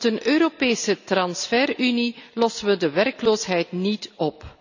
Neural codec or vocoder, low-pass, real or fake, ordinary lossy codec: none; 7.2 kHz; real; none